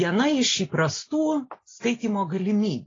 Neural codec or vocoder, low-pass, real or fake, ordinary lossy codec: none; 7.2 kHz; real; AAC, 32 kbps